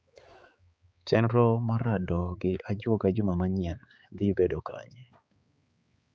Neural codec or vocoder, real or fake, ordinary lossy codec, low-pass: codec, 16 kHz, 4 kbps, X-Codec, HuBERT features, trained on balanced general audio; fake; none; none